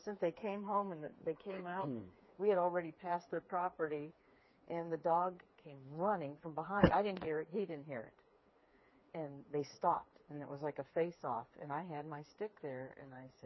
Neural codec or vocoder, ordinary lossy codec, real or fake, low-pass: codec, 16 kHz, 4 kbps, FreqCodec, smaller model; MP3, 24 kbps; fake; 7.2 kHz